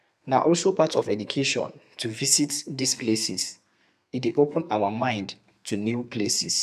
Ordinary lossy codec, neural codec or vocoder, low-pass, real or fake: none; codec, 32 kHz, 1.9 kbps, SNAC; 14.4 kHz; fake